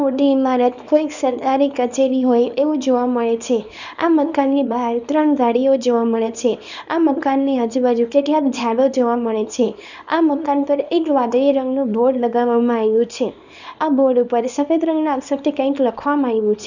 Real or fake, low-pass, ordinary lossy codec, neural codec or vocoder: fake; 7.2 kHz; none; codec, 24 kHz, 0.9 kbps, WavTokenizer, small release